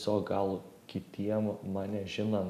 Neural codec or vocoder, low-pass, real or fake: none; 14.4 kHz; real